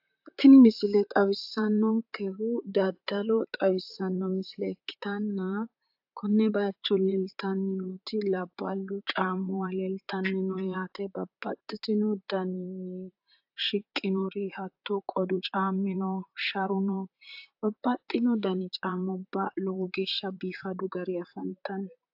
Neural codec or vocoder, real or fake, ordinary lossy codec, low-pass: vocoder, 44.1 kHz, 128 mel bands, Pupu-Vocoder; fake; AAC, 48 kbps; 5.4 kHz